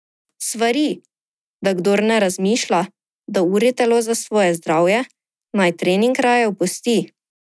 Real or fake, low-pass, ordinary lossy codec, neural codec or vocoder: real; none; none; none